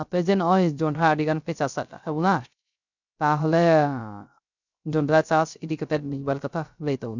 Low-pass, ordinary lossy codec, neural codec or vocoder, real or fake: 7.2 kHz; none; codec, 16 kHz, 0.3 kbps, FocalCodec; fake